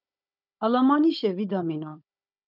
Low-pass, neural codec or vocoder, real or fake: 5.4 kHz; codec, 16 kHz, 16 kbps, FunCodec, trained on Chinese and English, 50 frames a second; fake